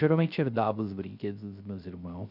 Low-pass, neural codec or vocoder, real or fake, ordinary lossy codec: 5.4 kHz; codec, 16 kHz, 0.3 kbps, FocalCodec; fake; none